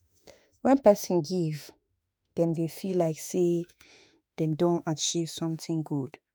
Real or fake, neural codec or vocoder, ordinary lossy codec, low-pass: fake; autoencoder, 48 kHz, 32 numbers a frame, DAC-VAE, trained on Japanese speech; none; none